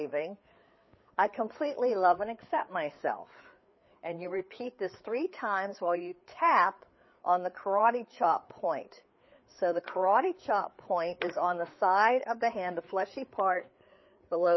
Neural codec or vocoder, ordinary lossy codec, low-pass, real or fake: codec, 16 kHz, 4 kbps, FreqCodec, larger model; MP3, 24 kbps; 7.2 kHz; fake